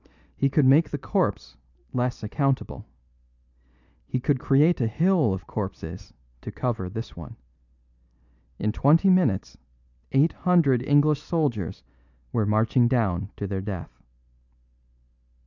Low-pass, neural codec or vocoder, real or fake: 7.2 kHz; none; real